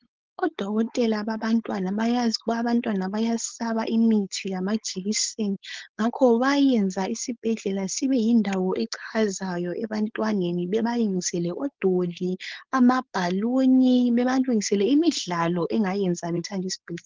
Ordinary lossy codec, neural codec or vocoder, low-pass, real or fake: Opus, 16 kbps; codec, 16 kHz, 4.8 kbps, FACodec; 7.2 kHz; fake